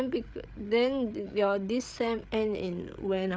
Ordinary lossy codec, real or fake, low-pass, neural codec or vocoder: none; fake; none; codec, 16 kHz, 8 kbps, FreqCodec, larger model